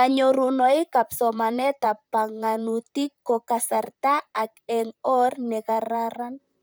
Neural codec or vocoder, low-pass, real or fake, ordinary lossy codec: vocoder, 44.1 kHz, 128 mel bands, Pupu-Vocoder; none; fake; none